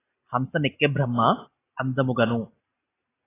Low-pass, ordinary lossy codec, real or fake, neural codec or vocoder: 3.6 kHz; AAC, 16 kbps; real; none